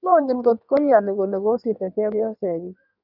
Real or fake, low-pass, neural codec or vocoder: fake; 5.4 kHz; codec, 16 kHz in and 24 kHz out, 1.1 kbps, FireRedTTS-2 codec